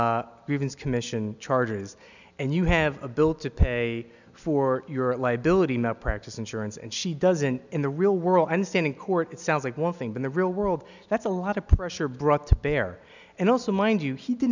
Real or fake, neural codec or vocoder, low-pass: real; none; 7.2 kHz